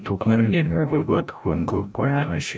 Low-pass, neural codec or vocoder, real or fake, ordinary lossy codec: none; codec, 16 kHz, 0.5 kbps, FreqCodec, larger model; fake; none